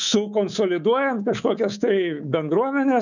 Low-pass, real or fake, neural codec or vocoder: 7.2 kHz; fake; codec, 24 kHz, 3.1 kbps, DualCodec